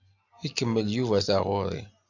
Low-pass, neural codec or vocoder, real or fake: 7.2 kHz; none; real